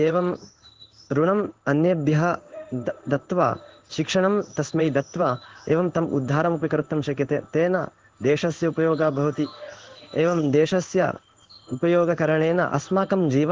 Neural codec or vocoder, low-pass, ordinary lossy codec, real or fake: codec, 16 kHz in and 24 kHz out, 1 kbps, XY-Tokenizer; 7.2 kHz; Opus, 16 kbps; fake